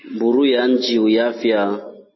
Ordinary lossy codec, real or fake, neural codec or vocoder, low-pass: MP3, 24 kbps; real; none; 7.2 kHz